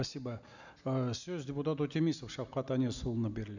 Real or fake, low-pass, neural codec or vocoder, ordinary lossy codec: real; 7.2 kHz; none; none